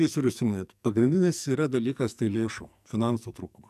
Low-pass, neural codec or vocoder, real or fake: 14.4 kHz; codec, 44.1 kHz, 2.6 kbps, SNAC; fake